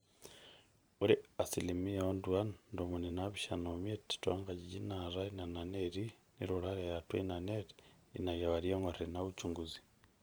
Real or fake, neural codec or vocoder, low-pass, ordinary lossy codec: fake; vocoder, 44.1 kHz, 128 mel bands every 512 samples, BigVGAN v2; none; none